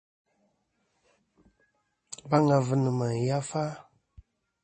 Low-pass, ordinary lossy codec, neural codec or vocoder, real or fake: 10.8 kHz; MP3, 32 kbps; none; real